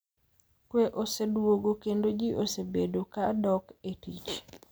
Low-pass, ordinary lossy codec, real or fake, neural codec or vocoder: none; none; real; none